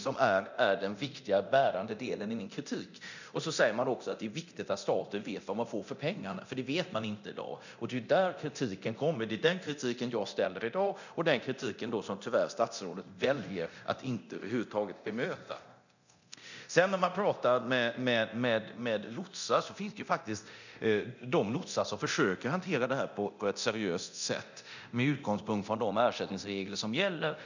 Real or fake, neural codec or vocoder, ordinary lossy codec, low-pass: fake; codec, 24 kHz, 0.9 kbps, DualCodec; none; 7.2 kHz